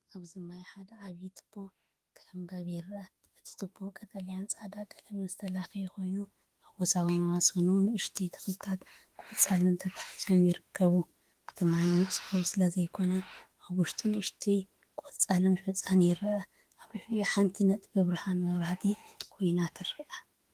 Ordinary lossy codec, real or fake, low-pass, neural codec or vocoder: Opus, 24 kbps; fake; 14.4 kHz; autoencoder, 48 kHz, 32 numbers a frame, DAC-VAE, trained on Japanese speech